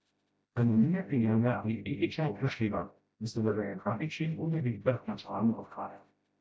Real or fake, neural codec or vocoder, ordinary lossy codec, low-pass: fake; codec, 16 kHz, 0.5 kbps, FreqCodec, smaller model; none; none